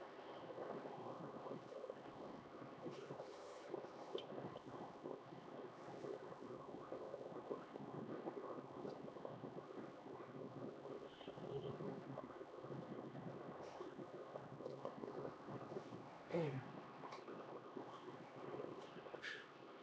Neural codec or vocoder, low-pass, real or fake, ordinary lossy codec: codec, 16 kHz, 2 kbps, X-Codec, HuBERT features, trained on LibriSpeech; none; fake; none